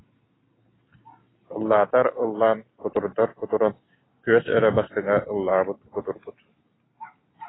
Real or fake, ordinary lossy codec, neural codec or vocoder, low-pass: real; AAC, 16 kbps; none; 7.2 kHz